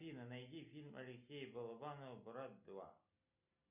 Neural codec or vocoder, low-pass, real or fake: none; 3.6 kHz; real